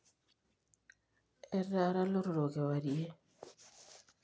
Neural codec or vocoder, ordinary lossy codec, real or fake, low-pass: none; none; real; none